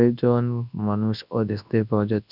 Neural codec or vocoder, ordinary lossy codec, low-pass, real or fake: codec, 24 kHz, 0.9 kbps, WavTokenizer, large speech release; none; 5.4 kHz; fake